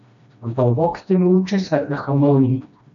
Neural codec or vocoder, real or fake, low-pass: codec, 16 kHz, 1 kbps, FreqCodec, smaller model; fake; 7.2 kHz